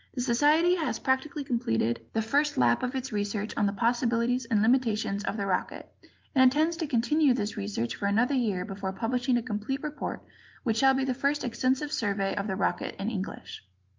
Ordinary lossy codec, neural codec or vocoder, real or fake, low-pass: Opus, 24 kbps; none; real; 7.2 kHz